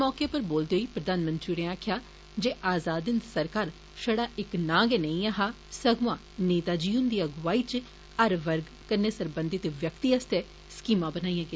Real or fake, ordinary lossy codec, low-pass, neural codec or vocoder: real; none; none; none